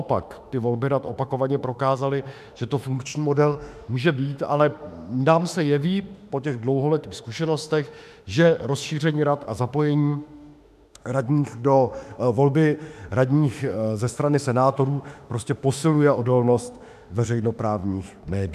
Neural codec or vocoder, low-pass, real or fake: autoencoder, 48 kHz, 32 numbers a frame, DAC-VAE, trained on Japanese speech; 14.4 kHz; fake